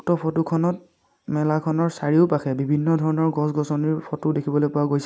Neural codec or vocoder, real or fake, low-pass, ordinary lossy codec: none; real; none; none